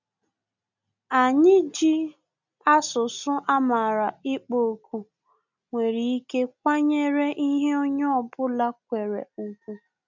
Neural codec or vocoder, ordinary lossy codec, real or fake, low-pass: none; none; real; 7.2 kHz